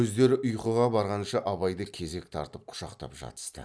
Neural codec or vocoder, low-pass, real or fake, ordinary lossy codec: none; none; real; none